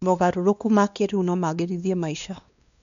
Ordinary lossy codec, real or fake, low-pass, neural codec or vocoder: none; fake; 7.2 kHz; codec, 16 kHz, 2 kbps, X-Codec, WavLM features, trained on Multilingual LibriSpeech